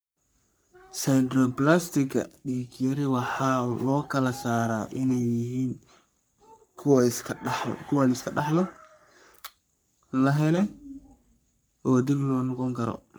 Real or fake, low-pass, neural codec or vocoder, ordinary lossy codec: fake; none; codec, 44.1 kHz, 3.4 kbps, Pupu-Codec; none